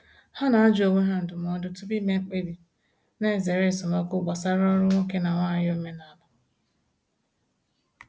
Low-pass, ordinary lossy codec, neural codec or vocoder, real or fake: none; none; none; real